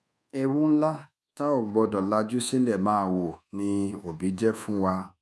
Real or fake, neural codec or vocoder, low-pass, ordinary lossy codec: fake; codec, 24 kHz, 1.2 kbps, DualCodec; none; none